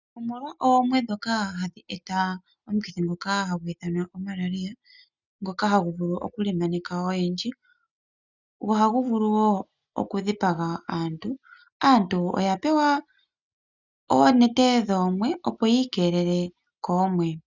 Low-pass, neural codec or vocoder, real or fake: 7.2 kHz; none; real